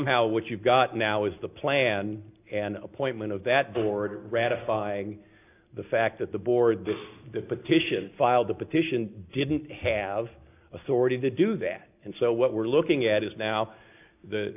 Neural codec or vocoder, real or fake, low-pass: none; real; 3.6 kHz